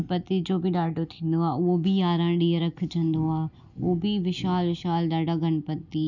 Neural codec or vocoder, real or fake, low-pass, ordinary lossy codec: none; real; 7.2 kHz; none